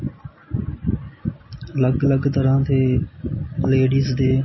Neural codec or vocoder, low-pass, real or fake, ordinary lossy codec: none; 7.2 kHz; real; MP3, 24 kbps